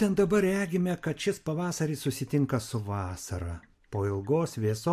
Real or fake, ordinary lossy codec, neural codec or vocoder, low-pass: real; MP3, 64 kbps; none; 14.4 kHz